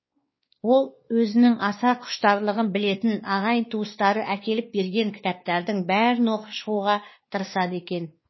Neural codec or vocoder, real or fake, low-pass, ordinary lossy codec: codec, 16 kHz, 2 kbps, X-Codec, WavLM features, trained on Multilingual LibriSpeech; fake; 7.2 kHz; MP3, 24 kbps